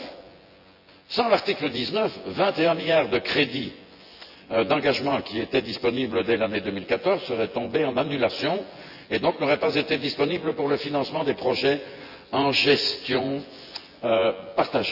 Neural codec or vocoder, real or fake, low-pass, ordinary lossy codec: vocoder, 24 kHz, 100 mel bands, Vocos; fake; 5.4 kHz; Opus, 64 kbps